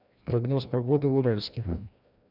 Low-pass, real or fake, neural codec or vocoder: 5.4 kHz; fake; codec, 16 kHz, 1 kbps, FreqCodec, larger model